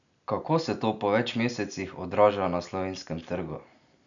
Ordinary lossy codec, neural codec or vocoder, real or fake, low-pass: none; none; real; 7.2 kHz